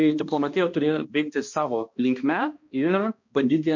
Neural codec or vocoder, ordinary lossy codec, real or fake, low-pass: codec, 16 kHz, 1 kbps, X-Codec, HuBERT features, trained on balanced general audio; MP3, 48 kbps; fake; 7.2 kHz